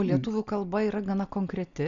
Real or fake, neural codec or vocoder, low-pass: real; none; 7.2 kHz